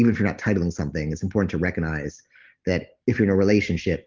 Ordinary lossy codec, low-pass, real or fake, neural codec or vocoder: Opus, 16 kbps; 7.2 kHz; real; none